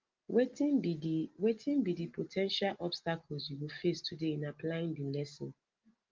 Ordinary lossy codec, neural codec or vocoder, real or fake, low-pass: Opus, 32 kbps; none; real; 7.2 kHz